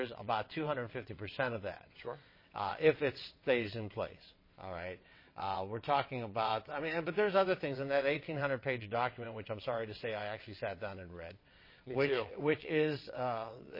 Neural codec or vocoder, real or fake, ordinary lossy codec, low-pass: vocoder, 22.05 kHz, 80 mel bands, WaveNeXt; fake; MP3, 24 kbps; 5.4 kHz